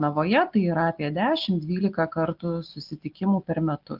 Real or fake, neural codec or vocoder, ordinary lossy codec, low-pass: real; none; Opus, 32 kbps; 5.4 kHz